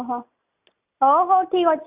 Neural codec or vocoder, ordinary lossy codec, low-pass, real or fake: none; Opus, 64 kbps; 3.6 kHz; real